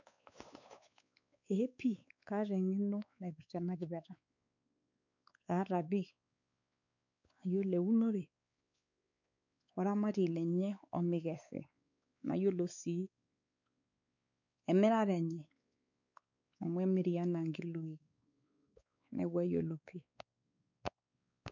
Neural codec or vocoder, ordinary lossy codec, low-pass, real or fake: codec, 16 kHz, 4 kbps, X-Codec, WavLM features, trained on Multilingual LibriSpeech; none; 7.2 kHz; fake